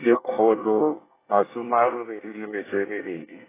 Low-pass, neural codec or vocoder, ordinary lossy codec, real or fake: 3.6 kHz; codec, 24 kHz, 1 kbps, SNAC; AAC, 16 kbps; fake